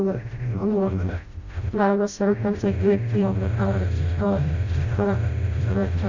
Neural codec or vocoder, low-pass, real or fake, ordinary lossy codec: codec, 16 kHz, 0.5 kbps, FreqCodec, smaller model; 7.2 kHz; fake; Opus, 64 kbps